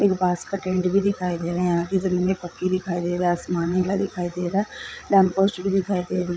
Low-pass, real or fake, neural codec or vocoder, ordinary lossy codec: none; fake; codec, 16 kHz, 8 kbps, FreqCodec, larger model; none